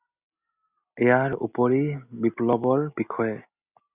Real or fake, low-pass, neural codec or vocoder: real; 3.6 kHz; none